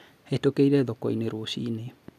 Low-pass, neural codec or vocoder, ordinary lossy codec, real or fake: 14.4 kHz; none; none; real